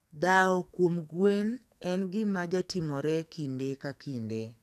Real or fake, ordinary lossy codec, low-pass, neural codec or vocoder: fake; none; 14.4 kHz; codec, 44.1 kHz, 2.6 kbps, SNAC